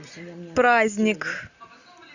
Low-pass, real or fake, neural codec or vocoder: 7.2 kHz; real; none